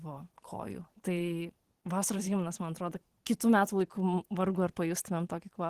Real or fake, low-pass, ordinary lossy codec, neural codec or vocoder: fake; 14.4 kHz; Opus, 16 kbps; vocoder, 44.1 kHz, 128 mel bands every 512 samples, BigVGAN v2